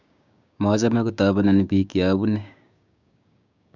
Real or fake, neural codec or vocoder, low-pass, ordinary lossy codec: fake; codec, 16 kHz, 6 kbps, DAC; 7.2 kHz; none